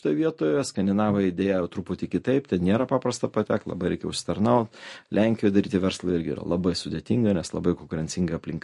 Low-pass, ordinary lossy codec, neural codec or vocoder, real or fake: 14.4 kHz; MP3, 48 kbps; none; real